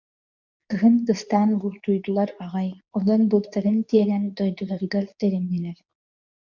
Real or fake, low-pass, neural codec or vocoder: fake; 7.2 kHz; codec, 24 kHz, 0.9 kbps, WavTokenizer, medium speech release version 2